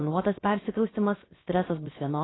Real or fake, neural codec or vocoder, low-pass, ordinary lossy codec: fake; codec, 16 kHz, about 1 kbps, DyCAST, with the encoder's durations; 7.2 kHz; AAC, 16 kbps